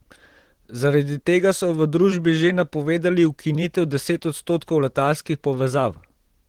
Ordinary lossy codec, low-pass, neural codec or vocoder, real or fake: Opus, 16 kbps; 19.8 kHz; vocoder, 44.1 kHz, 128 mel bands, Pupu-Vocoder; fake